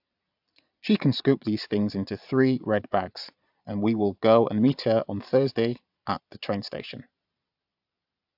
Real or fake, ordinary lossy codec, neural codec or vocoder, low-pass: fake; AAC, 48 kbps; vocoder, 22.05 kHz, 80 mel bands, Vocos; 5.4 kHz